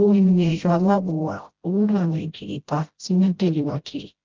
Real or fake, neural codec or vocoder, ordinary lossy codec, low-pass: fake; codec, 16 kHz, 0.5 kbps, FreqCodec, smaller model; Opus, 32 kbps; 7.2 kHz